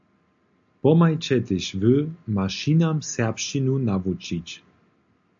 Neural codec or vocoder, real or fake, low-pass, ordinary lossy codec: none; real; 7.2 kHz; AAC, 64 kbps